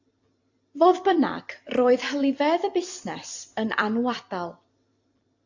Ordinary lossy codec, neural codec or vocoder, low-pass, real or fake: AAC, 48 kbps; vocoder, 44.1 kHz, 128 mel bands every 256 samples, BigVGAN v2; 7.2 kHz; fake